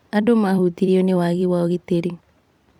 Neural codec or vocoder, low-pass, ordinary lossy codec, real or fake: vocoder, 44.1 kHz, 128 mel bands every 256 samples, BigVGAN v2; 19.8 kHz; none; fake